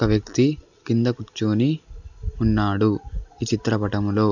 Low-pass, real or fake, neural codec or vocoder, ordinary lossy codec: 7.2 kHz; real; none; none